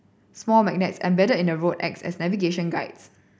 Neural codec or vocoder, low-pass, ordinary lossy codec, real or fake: none; none; none; real